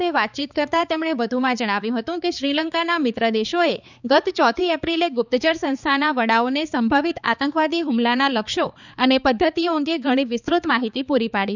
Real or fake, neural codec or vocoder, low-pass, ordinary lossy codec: fake; codec, 16 kHz, 4 kbps, X-Codec, HuBERT features, trained on balanced general audio; 7.2 kHz; none